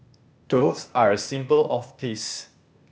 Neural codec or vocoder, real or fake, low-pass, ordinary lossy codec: codec, 16 kHz, 0.8 kbps, ZipCodec; fake; none; none